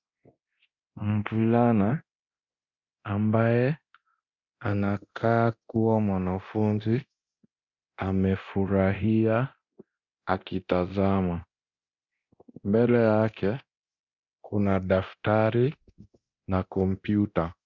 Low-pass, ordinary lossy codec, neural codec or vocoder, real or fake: 7.2 kHz; Opus, 64 kbps; codec, 24 kHz, 0.9 kbps, DualCodec; fake